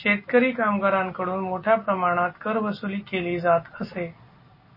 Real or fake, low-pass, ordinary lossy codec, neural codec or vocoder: real; 5.4 kHz; MP3, 24 kbps; none